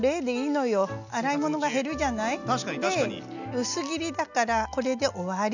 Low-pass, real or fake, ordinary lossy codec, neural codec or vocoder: 7.2 kHz; real; none; none